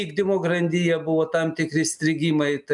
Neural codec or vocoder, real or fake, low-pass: none; real; 10.8 kHz